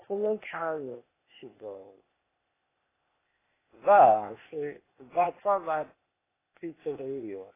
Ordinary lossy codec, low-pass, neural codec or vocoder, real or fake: AAC, 16 kbps; 3.6 kHz; codec, 16 kHz, 0.8 kbps, ZipCodec; fake